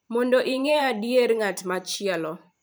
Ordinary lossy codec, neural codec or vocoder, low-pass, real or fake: none; vocoder, 44.1 kHz, 128 mel bands every 512 samples, BigVGAN v2; none; fake